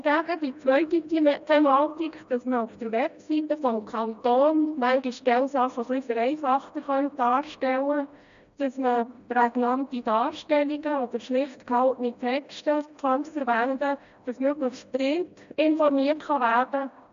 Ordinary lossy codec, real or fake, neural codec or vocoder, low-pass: MP3, 64 kbps; fake; codec, 16 kHz, 1 kbps, FreqCodec, smaller model; 7.2 kHz